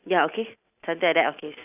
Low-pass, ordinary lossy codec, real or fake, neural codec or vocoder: 3.6 kHz; none; real; none